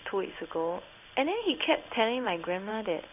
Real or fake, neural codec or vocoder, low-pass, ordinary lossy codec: fake; codec, 16 kHz in and 24 kHz out, 1 kbps, XY-Tokenizer; 3.6 kHz; none